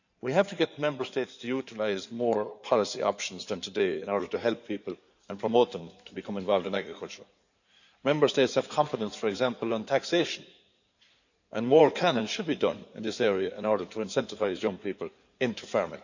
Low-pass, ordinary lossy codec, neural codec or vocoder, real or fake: 7.2 kHz; none; codec, 16 kHz in and 24 kHz out, 2.2 kbps, FireRedTTS-2 codec; fake